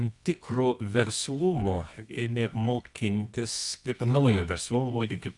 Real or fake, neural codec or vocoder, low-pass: fake; codec, 24 kHz, 0.9 kbps, WavTokenizer, medium music audio release; 10.8 kHz